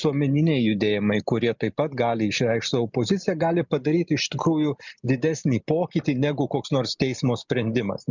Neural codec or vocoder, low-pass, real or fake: none; 7.2 kHz; real